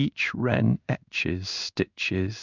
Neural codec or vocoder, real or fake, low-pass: codec, 16 kHz in and 24 kHz out, 1 kbps, XY-Tokenizer; fake; 7.2 kHz